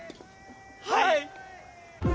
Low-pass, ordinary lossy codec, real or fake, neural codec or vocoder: none; none; real; none